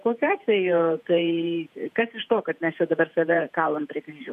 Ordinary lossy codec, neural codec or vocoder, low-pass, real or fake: MP3, 96 kbps; vocoder, 48 kHz, 128 mel bands, Vocos; 14.4 kHz; fake